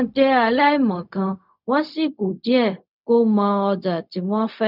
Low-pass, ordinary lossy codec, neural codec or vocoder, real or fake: 5.4 kHz; none; codec, 16 kHz, 0.4 kbps, LongCat-Audio-Codec; fake